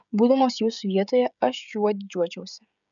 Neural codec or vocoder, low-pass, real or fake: codec, 16 kHz, 16 kbps, FreqCodec, smaller model; 7.2 kHz; fake